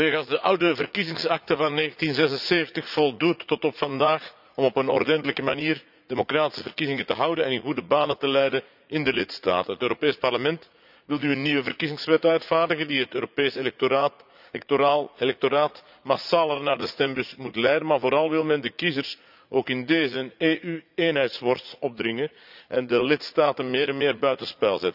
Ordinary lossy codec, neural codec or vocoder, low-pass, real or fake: none; vocoder, 44.1 kHz, 80 mel bands, Vocos; 5.4 kHz; fake